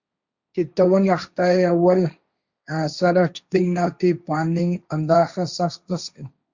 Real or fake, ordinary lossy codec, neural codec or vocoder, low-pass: fake; Opus, 64 kbps; codec, 16 kHz, 1.1 kbps, Voila-Tokenizer; 7.2 kHz